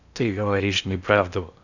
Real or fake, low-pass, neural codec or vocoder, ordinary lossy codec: fake; 7.2 kHz; codec, 16 kHz in and 24 kHz out, 0.8 kbps, FocalCodec, streaming, 65536 codes; none